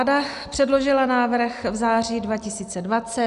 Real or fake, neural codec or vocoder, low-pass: real; none; 10.8 kHz